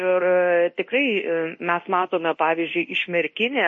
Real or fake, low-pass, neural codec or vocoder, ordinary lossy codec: fake; 10.8 kHz; codec, 24 kHz, 0.9 kbps, DualCodec; MP3, 32 kbps